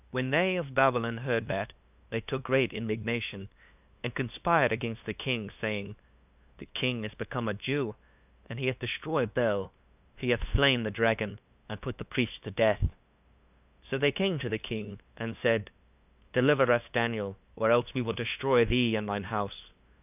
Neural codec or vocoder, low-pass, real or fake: codec, 16 kHz, 2 kbps, FunCodec, trained on LibriTTS, 25 frames a second; 3.6 kHz; fake